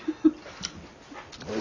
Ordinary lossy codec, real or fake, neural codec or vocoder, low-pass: none; real; none; 7.2 kHz